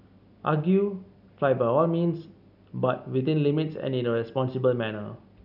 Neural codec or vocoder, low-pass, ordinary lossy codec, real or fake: none; 5.4 kHz; none; real